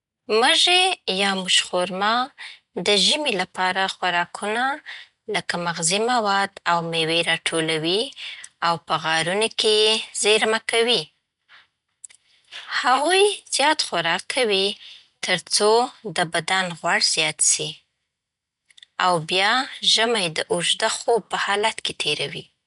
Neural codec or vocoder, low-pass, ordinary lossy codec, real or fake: none; 10.8 kHz; none; real